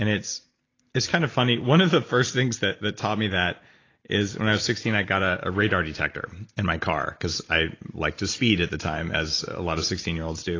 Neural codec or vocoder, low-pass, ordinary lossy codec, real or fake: none; 7.2 kHz; AAC, 32 kbps; real